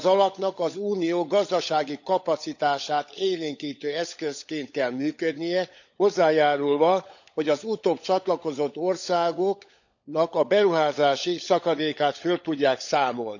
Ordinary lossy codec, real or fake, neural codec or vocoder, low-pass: none; fake; codec, 16 kHz, 16 kbps, FunCodec, trained on LibriTTS, 50 frames a second; 7.2 kHz